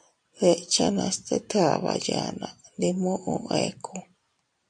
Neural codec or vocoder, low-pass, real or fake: none; 9.9 kHz; real